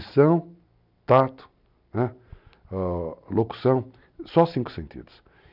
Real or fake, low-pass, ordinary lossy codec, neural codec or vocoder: real; 5.4 kHz; none; none